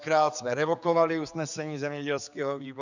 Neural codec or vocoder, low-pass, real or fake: codec, 16 kHz, 4 kbps, X-Codec, HuBERT features, trained on general audio; 7.2 kHz; fake